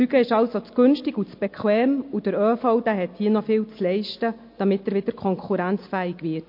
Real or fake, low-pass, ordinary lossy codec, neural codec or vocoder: real; 5.4 kHz; MP3, 32 kbps; none